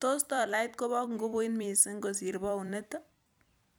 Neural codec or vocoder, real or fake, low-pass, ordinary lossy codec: vocoder, 44.1 kHz, 128 mel bands every 256 samples, BigVGAN v2; fake; none; none